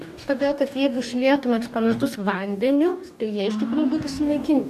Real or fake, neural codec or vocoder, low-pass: fake; codec, 44.1 kHz, 2.6 kbps, DAC; 14.4 kHz